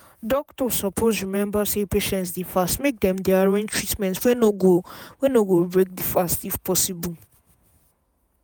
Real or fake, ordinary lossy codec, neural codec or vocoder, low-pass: fake; none; vocoder, 48 kHz, 128 mel bands, Vocos; none